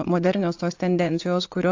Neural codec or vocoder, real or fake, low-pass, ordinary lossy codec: vocoder, 22.05 kHz, 80 mel bands, Vocos; fake; 7.2 kHz; AAC, 48 kbps